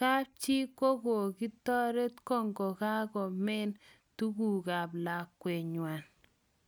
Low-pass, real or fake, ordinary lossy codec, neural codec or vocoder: none; real; none; none